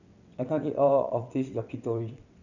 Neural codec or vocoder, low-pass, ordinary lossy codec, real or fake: vocoder, 22.05 kHz, 80 mel bands, WaveNeXt; 7.2 kHz; none; fake